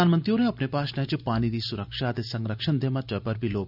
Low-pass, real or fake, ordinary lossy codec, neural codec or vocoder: 5.4 kHz; real; none; none